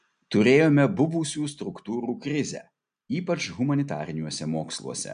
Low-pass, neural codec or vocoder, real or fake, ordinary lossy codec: 9.9 kHz; none; real; MP3, 64 kbps